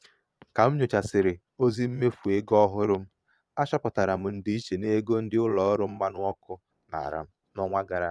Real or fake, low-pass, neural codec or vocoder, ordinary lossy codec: fake; none; vocoder, 22.05 kHz, 80 mel bands, Vocos; none